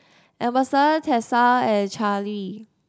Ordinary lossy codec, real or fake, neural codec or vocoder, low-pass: none; real; none; none